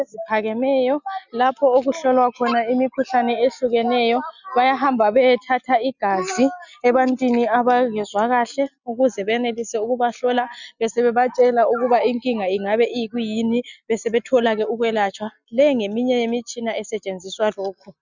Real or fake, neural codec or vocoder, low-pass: real; none; 7.2 kHz